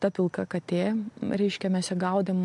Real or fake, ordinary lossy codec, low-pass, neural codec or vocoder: real; MP3, 64 kbps; 10.8 kHz; none